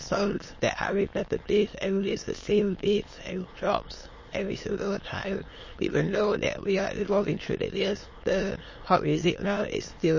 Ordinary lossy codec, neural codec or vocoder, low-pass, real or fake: MP3, 32 kbps; autoencoder, 22.05 kHz, a latent of 192 numbers a frame, VITS, trained on many speakers; 7.2 kHz; fake